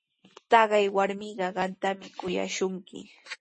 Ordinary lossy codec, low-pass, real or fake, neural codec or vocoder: MP3, 32 kbps; 9.9 kHz; real; none